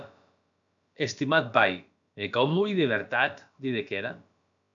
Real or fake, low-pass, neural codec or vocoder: fake; 7.2 kHz; codec, 16 kHz, about 1 kbps, DyCAST, with the encoder's durations